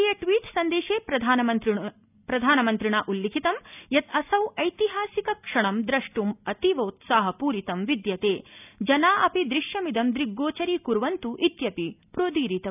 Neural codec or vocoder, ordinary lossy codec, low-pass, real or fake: none; none; 3.6 kHz; real